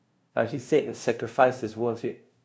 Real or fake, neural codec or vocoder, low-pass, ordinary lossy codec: fake; codec, 16 kHz, 0.5 kbps, FunCodec, trained on LibriTTS, 25 frames a second; none; none